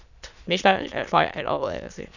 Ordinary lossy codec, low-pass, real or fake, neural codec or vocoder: none; 7.2 kHz; fake; autoencoder, 22.05 kHz, a latent of 192 numbers a frame, VITS, trained on many speakers